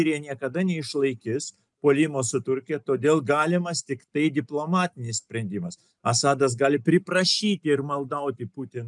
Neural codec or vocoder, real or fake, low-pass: none; real; 10.8 kHz